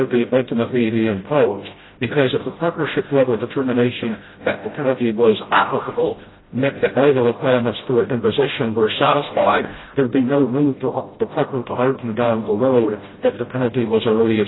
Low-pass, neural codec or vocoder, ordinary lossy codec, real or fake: 7.2 kHz; codec, 16 kHz, 0.5 kbps, FreqCodec, smaller model; AAC, 16 kbps; fake